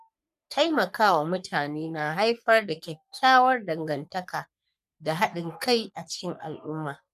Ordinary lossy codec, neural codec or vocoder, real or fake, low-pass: none; codec, 44.1 kHz, 3.4 kbps, Pupu-Codec; fake; 14.4 kHz